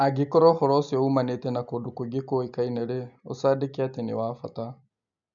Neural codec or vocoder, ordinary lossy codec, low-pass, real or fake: none; none; 7.2 kHz; real